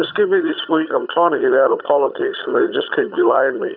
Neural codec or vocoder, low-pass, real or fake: vocoder, 22.05 kHz, 80 mel bands, HiFi-GAN; 5.4 kHz; fake